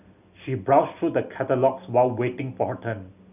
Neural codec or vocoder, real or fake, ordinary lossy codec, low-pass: none; real; none; 3.6 kHz